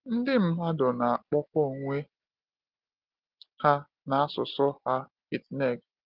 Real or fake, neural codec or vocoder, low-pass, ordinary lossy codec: real; none; 5.4 kHz; Opus, 16 kbps